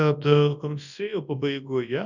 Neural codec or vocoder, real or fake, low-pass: codec, 24 kHz, 0.9 kbps, WavTokenizer, large speech release; fake; 7.2 kHz